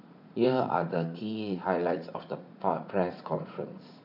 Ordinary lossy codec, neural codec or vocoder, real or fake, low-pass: none; vocoder, 44.1 kHz, 80 mel bands, Vocos; fake; 5.4 kHz